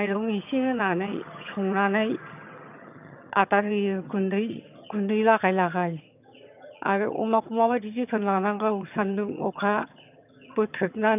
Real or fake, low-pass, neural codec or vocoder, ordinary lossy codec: fake; 3.6 kHz; vocoder, 22.05 kHz, 80 mel bands, HiFi-GAN; none